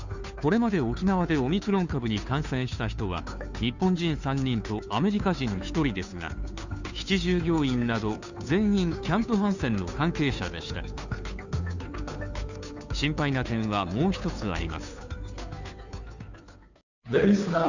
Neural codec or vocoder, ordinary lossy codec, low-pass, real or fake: codec, 16 kHz, 2 kbps, FunCodec, trained on Chinese and English, 25 frames a second; none; 7.2 kHz; fake